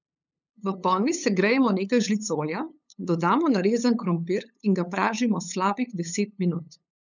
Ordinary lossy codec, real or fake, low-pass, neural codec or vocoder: none; fake; 7.2 kHz; codec, 16 kHz, 8 kbps, FunCodec, trained on LibriTTS, 25 frames a second